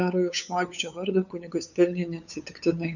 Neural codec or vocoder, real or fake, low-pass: codec, 16 kHz, 8 kbps, FunCodec, trained on LibriTTS, 25 frames a second; fake; 7.2 kHz